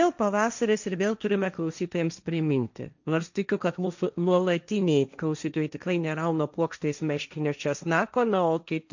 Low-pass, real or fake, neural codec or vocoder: 7.2 kHz; fake; codec, 16 kHz, 1.1 kbps, Voila-Tokenizer